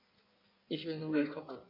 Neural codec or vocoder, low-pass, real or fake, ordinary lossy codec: codec, 16 kHz in and 24 kHz out, 1.1 kbps, FireRedTTS-2 codec; 5.4 kHz; fake; MP3, 32 kbps